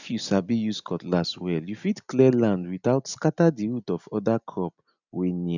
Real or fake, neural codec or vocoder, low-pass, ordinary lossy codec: real; none; 7.2 kHz; none